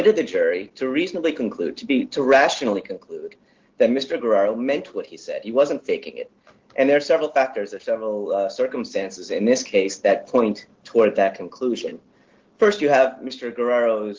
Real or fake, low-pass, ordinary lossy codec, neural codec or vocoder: real; 7.2 kHz; Opus, 16 kbps; none